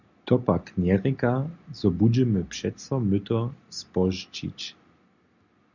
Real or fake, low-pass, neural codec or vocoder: real; 7.2 kHz; none